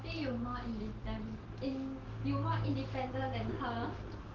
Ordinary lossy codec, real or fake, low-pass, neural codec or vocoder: Opus, 16 kbps; real; 7.2 kHz; none